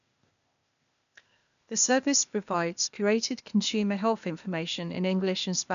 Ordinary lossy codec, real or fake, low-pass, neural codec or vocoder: none; fake; 7.2 kHz; codec, 16 kHz, 0.8 kbps, ZipCodec